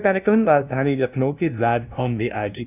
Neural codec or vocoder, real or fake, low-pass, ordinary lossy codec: codec, 16 kHz, 0.5 kbps, FunCodec, trained on LibriTTS, 25 frames a second; fake; 3.6 kHz; none